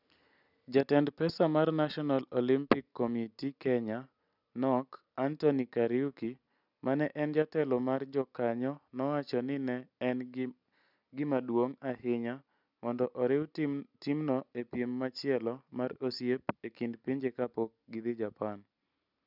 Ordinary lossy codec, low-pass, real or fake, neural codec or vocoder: none; 5.4 kHz; real; none